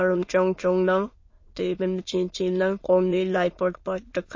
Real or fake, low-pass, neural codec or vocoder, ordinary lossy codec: fake; 7.2 kHz; autoencoder, 22.05 kHz, a latent of 192 numbers a frame, VITS, trained on many speakers; MP3, 32 kbps